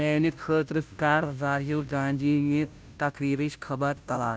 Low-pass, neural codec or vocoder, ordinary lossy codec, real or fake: none; codec, 16 kHz, 0.5 kbps, FunCodec, trained on Chinese and English, 25 frames a second; none; fake